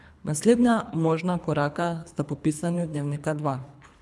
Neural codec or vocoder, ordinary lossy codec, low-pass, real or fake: codec, 24 kHz, 3 kbps, HILCodec; none; none; fake